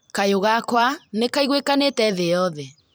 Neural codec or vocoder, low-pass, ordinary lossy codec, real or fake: vocoder, 44.1 kHz, 128 mel bands every 256 samples, BigVGAN v2; none; none; fake